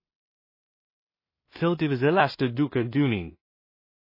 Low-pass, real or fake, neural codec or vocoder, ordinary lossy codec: 5.4 kHz; fake; codec, 16 kHz in and 24 kHz out, 0.4 kbps, LongCat-Audio-Codec, two codebook decoder; MP3, 24 kbps